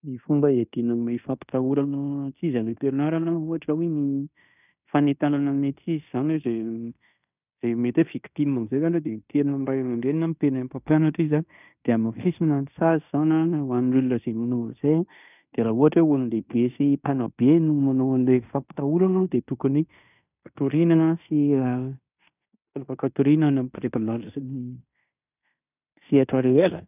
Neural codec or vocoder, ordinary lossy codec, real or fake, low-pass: codec, 16 kHz in and 24 kHz out, 0.9 kbps, LongCat-Audio-Codec, fine tuned four codebook decoder; none; fake; 3.6 kHz